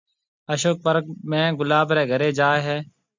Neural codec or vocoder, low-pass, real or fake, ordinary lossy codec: none; 7.2 kHz; real; MP3, 64 kbps